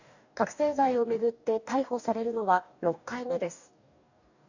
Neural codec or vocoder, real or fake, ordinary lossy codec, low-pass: codec, 44.1 kHz, 2.6 kbps, DAC; fake; none; 7.2 kHz